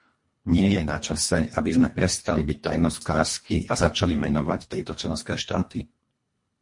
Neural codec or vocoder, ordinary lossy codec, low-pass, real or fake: codec, 24 kHz, 1.5 kbps, HILCodec; MP3, 48 kbps; 10.8 kHz; fake